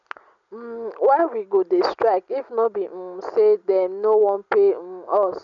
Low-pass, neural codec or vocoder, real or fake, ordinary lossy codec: 7.2 kHz; none; real; none